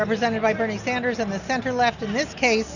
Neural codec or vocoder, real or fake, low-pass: none; real; 7.2 kHz